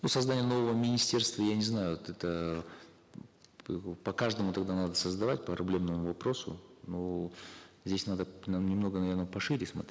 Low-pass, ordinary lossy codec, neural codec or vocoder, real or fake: none; none; none; real